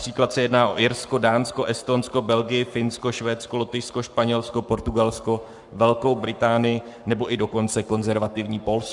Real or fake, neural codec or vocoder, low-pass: fake; codec, 44.1 kHz, 7.8 kbps, Pupu-Codec; 10.8 kHz